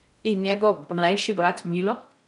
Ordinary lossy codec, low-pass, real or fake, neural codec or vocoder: none; 10.8 kHz; fake; codec, 16 kHz in and 24 kHz out, 0.6 kbps, FocalCodec, streaming, 4096 codes